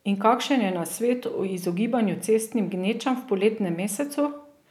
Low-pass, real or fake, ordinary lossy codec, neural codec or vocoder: 19.8 kHz; real; none; none